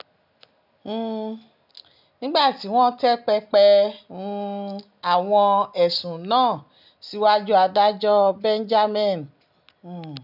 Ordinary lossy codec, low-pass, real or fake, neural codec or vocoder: none; 5.4 kHz; real; none